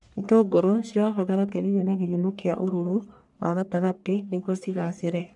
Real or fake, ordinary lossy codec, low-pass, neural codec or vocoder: fake; none; 10.8 kHz; codec, 44.1 kHz, 1.7 kbps, Pupu-Codec